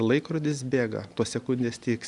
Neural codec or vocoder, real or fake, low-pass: none; real; 10.8 kHz